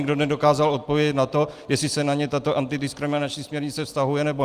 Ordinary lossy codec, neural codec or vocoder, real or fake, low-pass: Opus, 32 kbps; none; real; 14.4 kHz